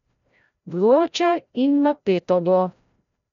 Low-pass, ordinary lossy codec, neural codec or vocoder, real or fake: 7.2 kHz; none; codec, 16 kHz, 0.5 kbps, FreqCodec, larger model; fake